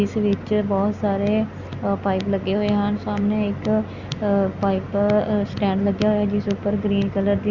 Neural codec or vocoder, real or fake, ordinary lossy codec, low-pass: none; real; Opus, 64 kbps; 7.2 kHz